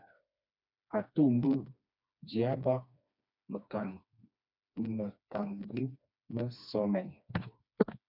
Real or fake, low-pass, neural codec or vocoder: fake; 5.4 kHz; codec, 16 kHz, 2 kbps, FreqCodec, smaller model